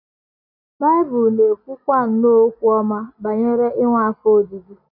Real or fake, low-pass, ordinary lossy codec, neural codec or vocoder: real; 5.4 kHz; none; none